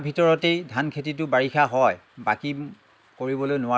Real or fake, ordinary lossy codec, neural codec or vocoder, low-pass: real; none; none; none